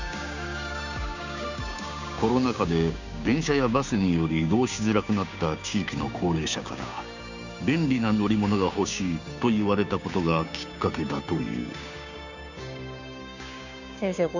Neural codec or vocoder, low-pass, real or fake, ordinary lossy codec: codec, 16 kHz, 6 kbps, DAC; 7.2 kHz; fake; none